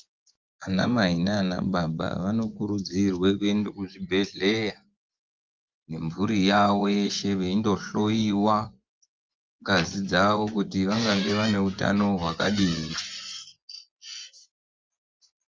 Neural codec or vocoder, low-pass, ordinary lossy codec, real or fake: vocoder, 24 kHz, 100 mel bands, Vocos; 7.2 kHz; Opus, 32 kbps; fake